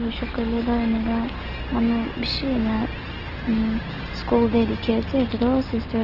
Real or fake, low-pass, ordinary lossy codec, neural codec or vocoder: real; 5.4 kHz; Opus, 16 kbps; none